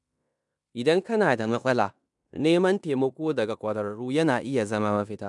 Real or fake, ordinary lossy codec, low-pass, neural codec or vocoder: fake; none; 10.8 kHz; codec, 16 kHz in and 24 kHz out, 0.9 kbps, LongCat-Audio-Codec, fine tuned four codebook decoder